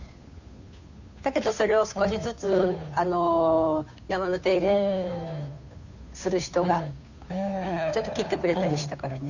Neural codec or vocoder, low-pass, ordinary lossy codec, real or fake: codec, 16 kHz, 2 kbps, FunCodec, trained on Chinese and English, 25 frames a second; 7.2 kHz; none; fake